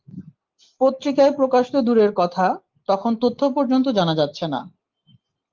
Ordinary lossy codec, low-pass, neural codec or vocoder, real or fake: Opus, 32 kbps; 7.2 kHz; none; real